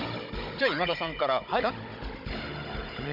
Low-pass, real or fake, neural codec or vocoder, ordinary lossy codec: 5.4 kHz; fake; codec, 16 kHz, 16 kbps, FunCodec, trained on Chinese and English, 50 frames a second; none